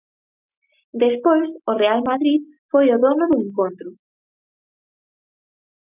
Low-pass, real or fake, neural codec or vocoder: 3.6 kHz; real; none